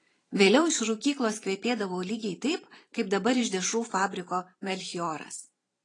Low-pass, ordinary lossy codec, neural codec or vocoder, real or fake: 10.8 kHz; AAC, 32 kbps; vocoder, 44.1 kHz, 128 mel bands every 512 samples, BigVGAN v2; fake